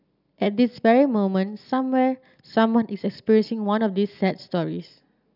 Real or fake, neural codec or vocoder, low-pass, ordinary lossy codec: real; none; 5.4 kHz; none